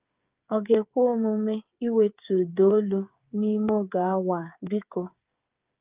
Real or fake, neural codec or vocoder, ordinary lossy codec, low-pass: fake; codec, 16 kHz, 8 kbps, FreqCodec, smaller model; Opus, 24 kbps; 3.6 kHz